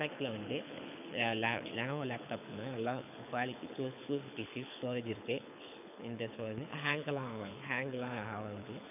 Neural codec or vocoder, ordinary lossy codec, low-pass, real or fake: codec, 24 kHz, 6 kbps, HILCodec; none; 3.6 kHz; fake